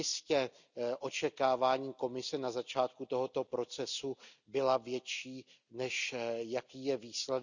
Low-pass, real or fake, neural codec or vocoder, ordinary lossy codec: 7.2 kHz; real; none; none